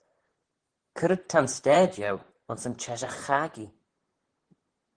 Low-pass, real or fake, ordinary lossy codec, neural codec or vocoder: 9.9 kHz; fake; Opus, 16 kbps; vocoder, 44.1 kHz, 128 mel bands, Pupu-Vocoder